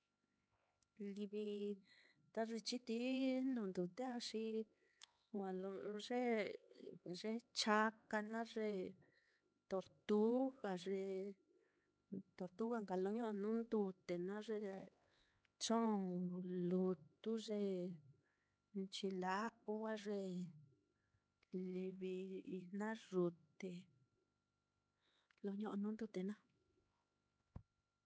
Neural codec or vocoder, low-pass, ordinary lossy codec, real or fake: codec, 16 kHz, 4 kbps, X-Codec, HuBERT features, trained on LibriSpeech; none; none; fake